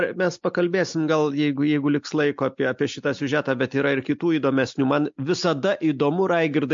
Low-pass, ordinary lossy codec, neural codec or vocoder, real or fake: 7.2 kHz; MP3, 48 kbps; none; real